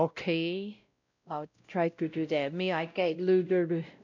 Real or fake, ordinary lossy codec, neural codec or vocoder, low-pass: fake; none; codec, 16 kHz, 0.5 kbps, X-Codec, WavLM features, trained on Multilingual LibriSpeech; 7.2 kHz